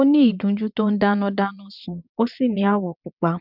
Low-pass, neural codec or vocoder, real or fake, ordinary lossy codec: 5.4 kHz; vocoder, 22.05 kHz, 80 mel bands, WaveNeXt; fake; none